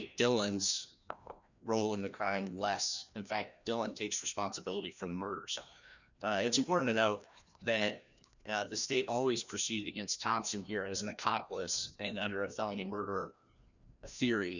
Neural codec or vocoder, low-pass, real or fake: codec, 16 kHz, 1 kbps, FreqCodec, larger model; 7.2 kHz; fake